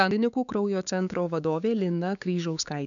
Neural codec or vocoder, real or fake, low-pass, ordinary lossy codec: codec, 16 kHz, 6 kbps, DAC; fake; 7.2 kHz; MP3, 96 kbps